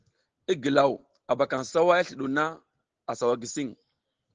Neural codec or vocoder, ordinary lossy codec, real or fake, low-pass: none; Opus, 16 kbps; real; 7.2 kHz